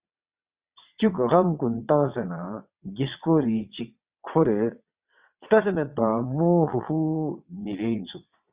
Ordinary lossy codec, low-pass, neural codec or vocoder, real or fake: Opus, 64 kbps; 3.6 kHz; vocoder, 22.05 kHz, 80 mel bands, WaveNeXt; fake